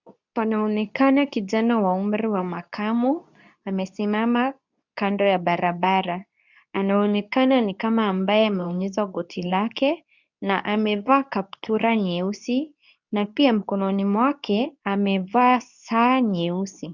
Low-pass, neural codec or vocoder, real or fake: 7.2 kHz; codec, 24 kHz, 0.9 kbps, WavTokenizer, medium speech release version 2; fake